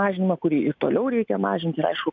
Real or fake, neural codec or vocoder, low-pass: real; none; 7.2 kHz